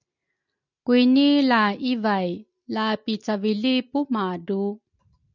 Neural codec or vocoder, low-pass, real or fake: none; 7.2 kHz; real